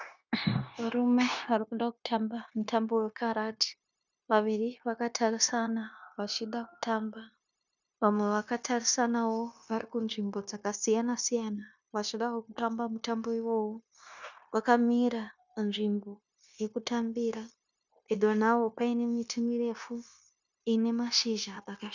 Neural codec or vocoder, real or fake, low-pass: codec, 16 kHz, 0.9 kbps, LongCat-Audio-Codec; fake; 7.2 kHz